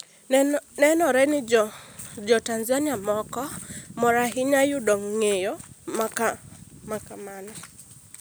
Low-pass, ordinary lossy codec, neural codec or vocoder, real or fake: none; none; none; real